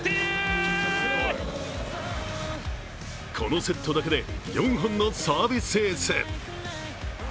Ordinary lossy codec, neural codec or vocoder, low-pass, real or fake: none; none; none; real